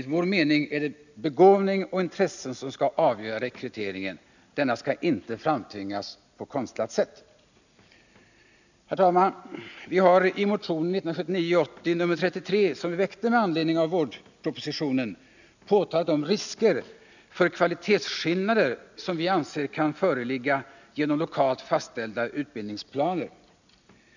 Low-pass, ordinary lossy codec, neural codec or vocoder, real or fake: 7.2 kHz; none; none; real